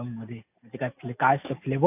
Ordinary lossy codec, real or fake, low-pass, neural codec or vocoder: AAC, 32 kbps; real; 3.6 kHz; none